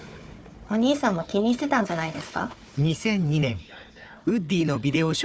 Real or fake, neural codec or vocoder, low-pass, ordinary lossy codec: fake; codec, 16 kHz, 4 kbps, FunCodec, trained on Chinese and English, 50 frames a second; none; none